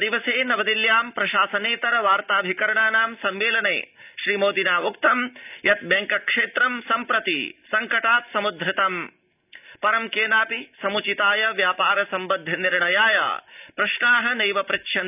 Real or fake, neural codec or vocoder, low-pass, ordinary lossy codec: real; none; 3.6 kHz; none